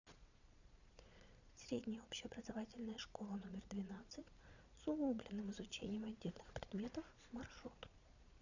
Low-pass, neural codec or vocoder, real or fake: 7.2 kHz; vocoder, 22.05 kHz, 80 mel bands, Vocos; fake